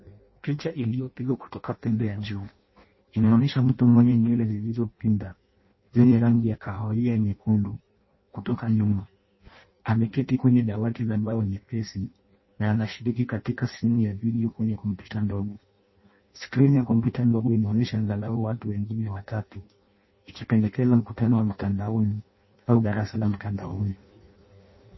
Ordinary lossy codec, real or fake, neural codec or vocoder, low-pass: MP3, 24 kbps; fake; codec, 16 kHz in and 24 kHz out, 0.6 kbps, FireRedTTS-2 codec; 7.2 kHz